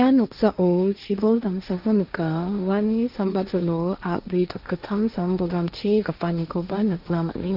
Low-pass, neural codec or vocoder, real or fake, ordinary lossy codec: 5.4 kHz; codec, 16 kHz, 1.1 kbps, Voila-Tokenizer; fake; none